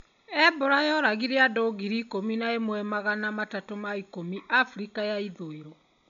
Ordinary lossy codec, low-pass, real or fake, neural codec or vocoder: none; 7.2 kHz; real; none